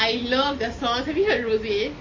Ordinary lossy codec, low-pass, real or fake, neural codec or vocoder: MP3, 32 kbps; 7.2 kHz; real; none